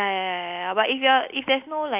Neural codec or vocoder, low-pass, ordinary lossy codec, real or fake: none; 3.6 kHz; none; real